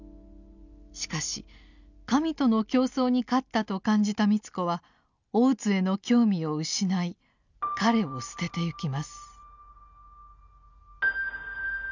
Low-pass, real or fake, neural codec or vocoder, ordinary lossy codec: 7.2 kHz; real; none; none